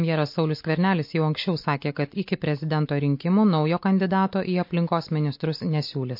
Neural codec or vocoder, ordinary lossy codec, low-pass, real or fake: none; MP3, 32 kbps; 5.4 kHz; real